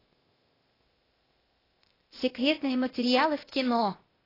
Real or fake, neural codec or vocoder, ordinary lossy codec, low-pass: fake; codec, 16 kHz, 0.8 kbps, ZipCodec; AAC, 24 kbps; 5.4 kHz